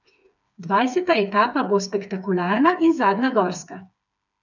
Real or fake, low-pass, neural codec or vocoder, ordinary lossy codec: fake; 7.2 kHz; codec, 16 kHz, 4 kbps, FreqCodec, smaller model; none